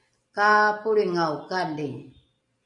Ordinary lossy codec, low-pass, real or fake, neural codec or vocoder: MP3, 64 kbps; 10.8 kHz; real; none